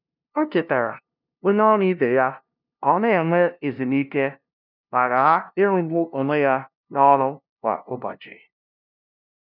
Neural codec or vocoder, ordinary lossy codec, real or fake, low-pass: codec, 16 kHz, 0.5 kbps, FunCodec, trained on LibriTTS, 25 frames a second; none; fake; 5.4 kHz